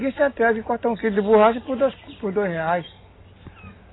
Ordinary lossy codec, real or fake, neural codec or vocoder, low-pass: AAC, 16 kbps; real; none; 7.2 kHz